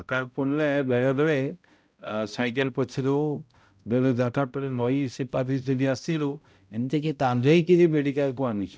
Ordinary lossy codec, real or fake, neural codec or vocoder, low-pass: none; fake; codec, 16 kHz, 0.5 kbps, X-Codec, HuBERT features, trained on balanced general audio; none